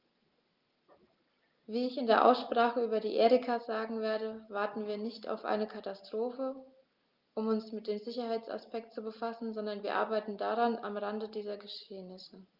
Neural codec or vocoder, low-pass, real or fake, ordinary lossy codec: none; 5.4 kHz; real; Opus, 24 kbps